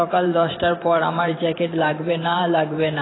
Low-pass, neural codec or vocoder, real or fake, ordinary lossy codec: 7.2 kHz; vocoder, 44.1 kHz, 128 mel bands every 512 samples, BigVGAN v2; fake; AAC, 16 kbps